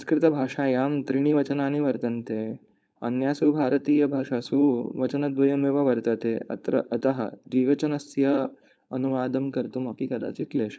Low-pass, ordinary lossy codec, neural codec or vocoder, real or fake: none; none; codec, 16 kHz, 4.8 kbps, FACodec; fake